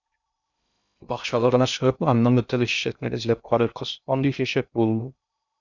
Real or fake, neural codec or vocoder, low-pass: fake; codec, 16 kHz in and 24 kHz out, 0.6 kbps, FocalCodec, streaming, 2048 codes; 7.2 kHz